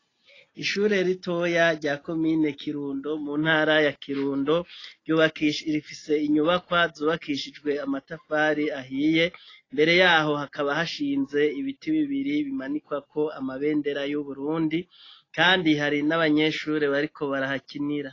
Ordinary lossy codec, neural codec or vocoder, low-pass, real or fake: AAC, 32 kbps; none; 7.2 kHz; real